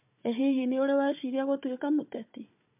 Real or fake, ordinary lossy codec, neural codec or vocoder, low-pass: fake; MP3, 32 kbps; codec, 16 kHz, 4 kbps, FunCodec, trained on Chinese and English, 50 frames a second; 3.6 kHz